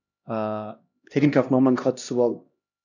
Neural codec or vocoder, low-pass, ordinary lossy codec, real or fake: codec, 16 kHz, 1 kbps, X-Codec, HuBERT features, trained on LibriSpeech; 7.2 kHz; none; fake